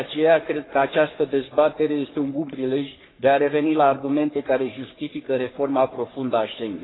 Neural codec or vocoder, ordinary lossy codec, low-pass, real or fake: codec, 44.1 kHz, 3.4 kbps, Pupu-Codec; AAC, 16 kbps; 7.2 kHz; fake